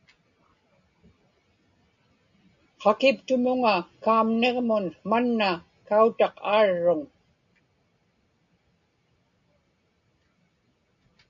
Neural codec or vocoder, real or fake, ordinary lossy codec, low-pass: none; real; MP3, 96 kbps; 7.2 kHz